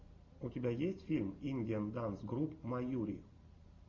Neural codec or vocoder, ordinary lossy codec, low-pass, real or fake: none; Opus, 64 kbps; 7.2 kHz; real